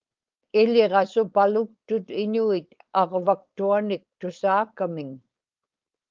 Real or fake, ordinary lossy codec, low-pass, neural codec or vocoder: fake; Opus, 24 kbps; 7.2 kHz; codec, 16 kHz, 4.8 kbps, FACodec